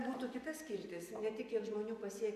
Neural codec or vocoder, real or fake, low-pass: vocoder, 44.1 kHz, 128 mel bands every 512 samples, BigVGAN v2; fake; 14.4 kHz